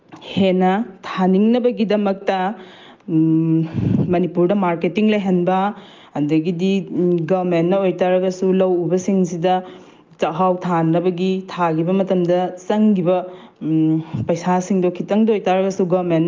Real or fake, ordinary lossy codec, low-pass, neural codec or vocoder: real; Opus, 32 kbps; 7.2 kHz; none